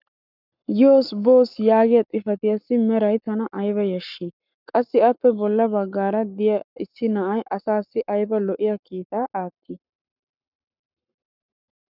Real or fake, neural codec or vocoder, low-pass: fake; codec, 44.1 kHz, 7.8 kbps, Pupu-Codec; 5.4 kHz